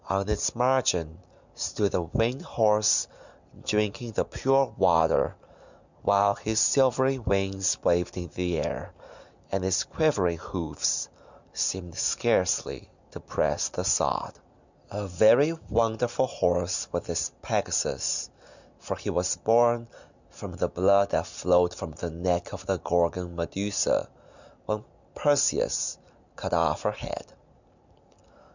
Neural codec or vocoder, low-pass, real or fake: none; 7.2 kHz; real